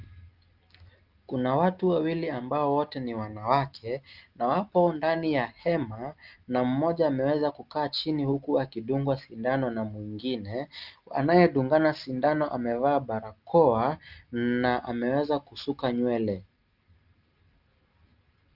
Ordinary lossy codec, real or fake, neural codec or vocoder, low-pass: Opus, 32 kbps; real; none; 5.4 kHz